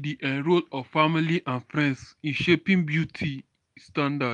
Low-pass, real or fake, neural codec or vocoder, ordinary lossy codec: 10.8 kHz; real; none; none